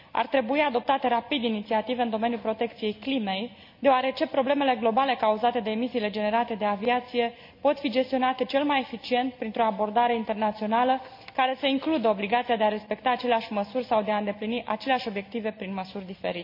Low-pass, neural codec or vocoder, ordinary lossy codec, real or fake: 5.4 kHz; none; MP3, 48 kbps; real